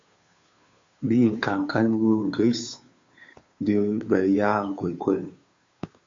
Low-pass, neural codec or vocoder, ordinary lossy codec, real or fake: 7.2 kHz; codec, 16 kHz, 2 kbps, FunCodec, trained on Chinese and English, 25 frames a second; AAC, 48 kbps; fake